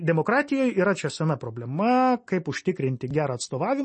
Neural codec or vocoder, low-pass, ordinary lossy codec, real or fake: none; 10.8 kHz; MP3, 32 kbps; real